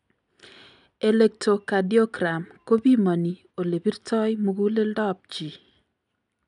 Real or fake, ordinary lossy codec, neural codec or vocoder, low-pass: real; none; none; 10.8 kHz